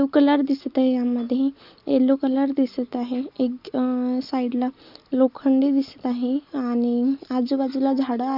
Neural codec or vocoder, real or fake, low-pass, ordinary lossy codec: none; real; 5.4 kHz; Opus, 64 kbps